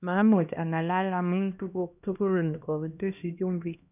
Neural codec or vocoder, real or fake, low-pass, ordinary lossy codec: codec, 16 kHz, 1 kbps, X-Codec, HuBERT features, trained on LibriSpeech; fake; 3.6 kHz; none